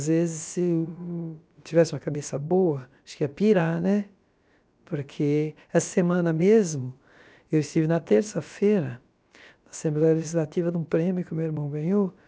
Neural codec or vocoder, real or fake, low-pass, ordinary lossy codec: codec, 16 kHz, about 1 kbps, DyCAST, with the encoder's durations; fake; none; none